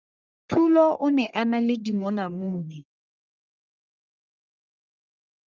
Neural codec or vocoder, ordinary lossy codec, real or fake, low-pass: codec, 44.1 kHz, 1.7 kbps, Pupu-Codec; Opus, 32 kbps; fake; 7.2 kHz